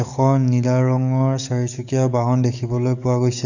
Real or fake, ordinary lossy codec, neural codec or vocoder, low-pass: fake; none; codec, 44.1 kHz, 7.8 kbps, DAC; 7.2 kHz